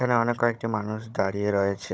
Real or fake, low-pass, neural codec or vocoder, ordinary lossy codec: fake; none; codec, 16 kHz, 16 kbps, FunCodec, trained on Chinese and English, 50 frames a second; none